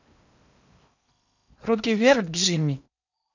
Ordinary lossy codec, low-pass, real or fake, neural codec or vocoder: none; 7.2 kHz; fake; codec, 16 kHz in and 24 kHz out, 0.8 kbps, FocalCodec, streaming, 65536 codes